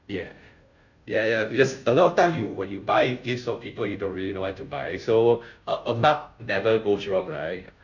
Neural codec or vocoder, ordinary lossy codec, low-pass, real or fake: codec, 16 kHz, 0.5 kbps, FunCodec, trained on Chinese and English, 25 frames a second; none; 7.2 kHz; fake